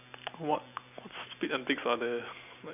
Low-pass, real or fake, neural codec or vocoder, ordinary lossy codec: 3.6 kHz; real; none; none